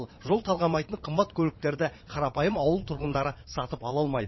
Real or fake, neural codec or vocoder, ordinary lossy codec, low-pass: fake; vocoder, 22.05 kHz, 80 mel bands, Vocos; MP3, 24 kbps; 7.2 kHz